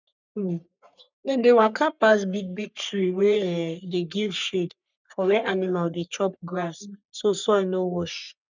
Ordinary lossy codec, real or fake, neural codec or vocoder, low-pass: none; fake; codec, 44.1 kHz, 3.4 kbps, Pupu-Codec; 7.2 kHz